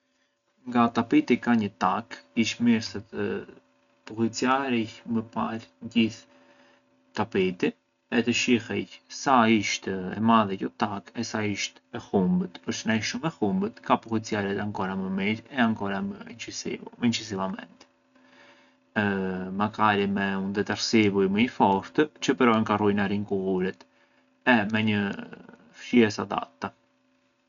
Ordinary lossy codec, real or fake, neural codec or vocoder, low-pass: none; real; none; 7.2 kHz